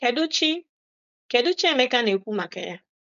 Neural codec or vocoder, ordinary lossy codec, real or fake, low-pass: codec, 16 kHz, 4.8 kbps, FACodec; none; fake; 7.2 kHz